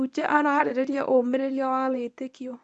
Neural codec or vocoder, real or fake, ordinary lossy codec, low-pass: codec, 24 kHz, 0.9 kbps, WavTokenizer, medium speech release version 1; fake; none; none